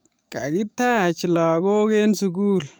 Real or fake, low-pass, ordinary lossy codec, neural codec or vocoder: fake; none; none; codec, 44.1 kHz, 7.8 kbps, DAC